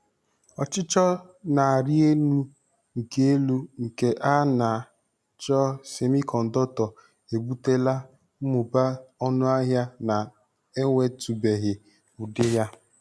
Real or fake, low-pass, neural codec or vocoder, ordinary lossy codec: real; none; none; none